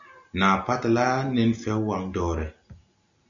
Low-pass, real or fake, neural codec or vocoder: 7.2 kHz; real; none